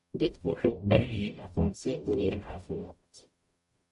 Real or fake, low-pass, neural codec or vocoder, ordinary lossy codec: fake; 14.4 kHz; codec, 44.1 kHz, 0.9 kbps, DAC; MP3, 48 kbps